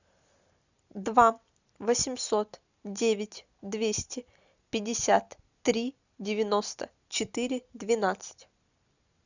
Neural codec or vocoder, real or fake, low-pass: none; real; 7.2 kHz